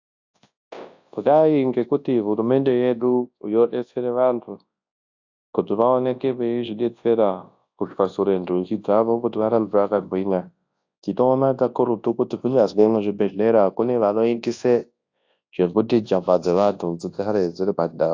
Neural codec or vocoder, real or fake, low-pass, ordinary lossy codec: codec, 24 kHz, 0.9 kbps, WavTokenizer, large speech release; fake; 7.2 kHz; AAC, 48 kbps